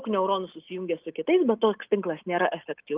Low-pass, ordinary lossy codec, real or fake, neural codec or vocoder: 3.6 kHz; Opus, 32 kbps; real; none